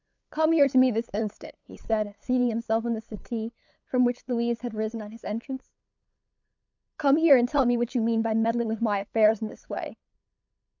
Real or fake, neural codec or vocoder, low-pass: fake; codec, 16 kHz, 8 kbps, FunCodec, trained on LibriTTS, 25 frames a second; 7.2 kHz